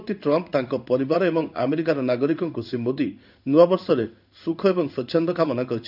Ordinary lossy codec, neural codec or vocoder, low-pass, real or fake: none; codec, 16 kHz in and 24 kHz out, 1 kbps, XY-Tokenizer; 5.4 kHz; fake